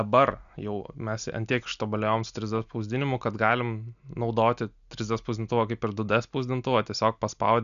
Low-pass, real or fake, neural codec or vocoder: 7.2 kHz; real; none